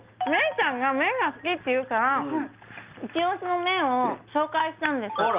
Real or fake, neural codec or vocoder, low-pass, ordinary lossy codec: real; none; 3.6 kHz; Opus, 32 kbps